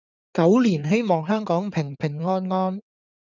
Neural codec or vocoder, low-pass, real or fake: codec, 16 kHz in and 24 kHz out, 2.2 kbps, FireRedTTS-2 codec; 7.2 kHz; fake